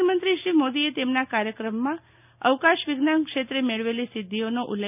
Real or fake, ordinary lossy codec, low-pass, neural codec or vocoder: real; none; 3.6 kHz; none